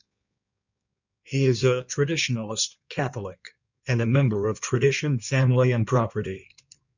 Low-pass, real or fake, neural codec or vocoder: 7.2 kHz; fake; codec, 16 kHz in and 24 kHz out, 1.1 kbps, FireRedTTS-2 codec